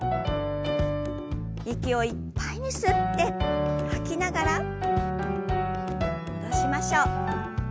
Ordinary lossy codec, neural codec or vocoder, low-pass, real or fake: none; none; none; real